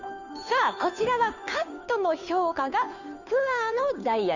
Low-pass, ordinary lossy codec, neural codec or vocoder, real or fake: 7.2 kHz; none; codec, 16 kHz, 2 kbps, FunCodec, trained on Chinese and English, 25 frames a second; fake